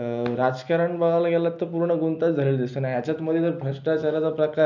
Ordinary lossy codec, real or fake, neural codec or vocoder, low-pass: none; real; none; 7.2 kHz